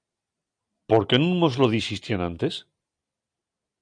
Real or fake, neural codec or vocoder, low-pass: real; none; 9.9 kHz